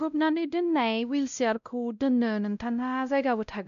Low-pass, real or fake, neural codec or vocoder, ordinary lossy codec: 7.2 kHz; fake; codec, 16 kHz, 0.5 kbps, X-Codec, WavLM features, trained on Multilingual LibriSpeech; none